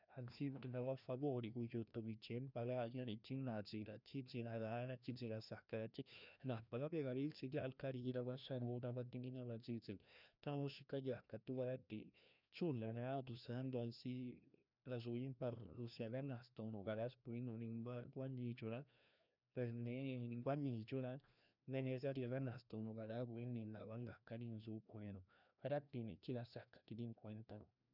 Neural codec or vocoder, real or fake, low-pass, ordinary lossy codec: codec, 16 kHz, 1 kbps, FreqCodec, larger model; fake; 5.4 kHz; none